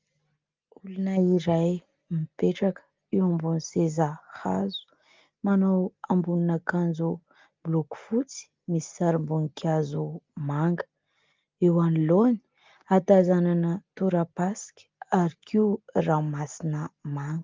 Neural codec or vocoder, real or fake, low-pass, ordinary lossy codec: none; real; 7.2 kHz; Opus, 32 kbps